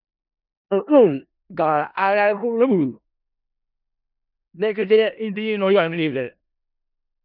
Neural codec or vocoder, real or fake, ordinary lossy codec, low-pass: codec, 16 kHz in and 24 kHz out, 0.4 kbps, LongCat-Audio-Codec, four codebook decoder; fake; none; 5.4 kHz